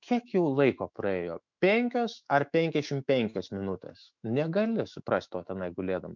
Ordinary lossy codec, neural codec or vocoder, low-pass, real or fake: MP3, 64 kbps; autoencoder, 48 kHz, 128 numbers a frame, DAC-VAE, trained on Japanese speech; 7.2 kHz; fake